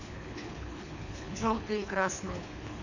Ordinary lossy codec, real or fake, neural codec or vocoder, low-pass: none; fake; codec, 24 kHz, 3 kbps, HILCodec; 7.2 kHz